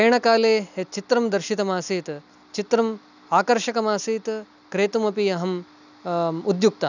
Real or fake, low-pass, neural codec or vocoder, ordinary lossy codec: real; 7.2 kHz; none; none